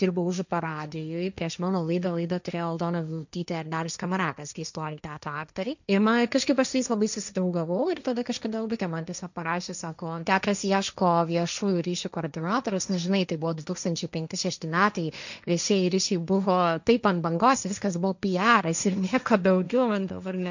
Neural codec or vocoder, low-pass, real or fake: codec, 16 kHz, 1.1 kbps, Voila-Tokenizer; 7.2 kHz; fake